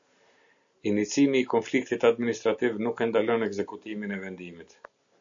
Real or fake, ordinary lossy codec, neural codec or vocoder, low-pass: real; AAC, 64 kbps; none; 7.2 kHz